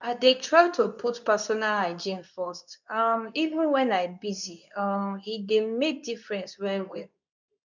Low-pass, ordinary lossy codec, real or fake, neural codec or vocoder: 7.2 kHz; none; fake; codec, 24 kHz, 0.9 kbps, WavTokenizer, medium speech release version 2